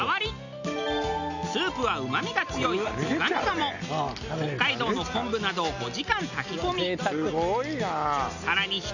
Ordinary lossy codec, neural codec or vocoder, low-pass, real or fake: none; none; 7.2 kHz; real